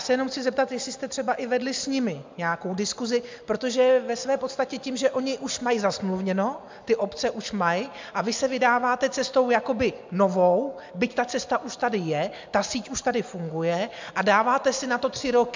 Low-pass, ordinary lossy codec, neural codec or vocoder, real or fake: 7.2 kHz; MP3, 64 kbps; none; real